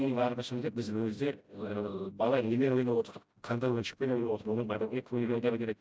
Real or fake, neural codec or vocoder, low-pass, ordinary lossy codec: fake; codec, 16 kHz, 0.5 kbps, FreqCodec, smaller model; none; none